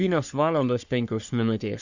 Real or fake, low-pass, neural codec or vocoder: fake; 7.2 kHz; codec, 44.1 kHz, 3.4 kbps, Pupu-Codec